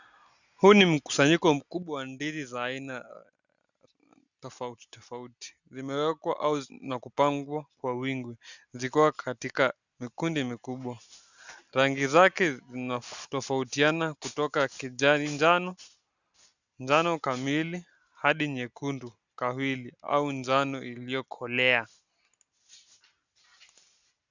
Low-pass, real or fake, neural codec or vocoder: 7.2 kHz; real; none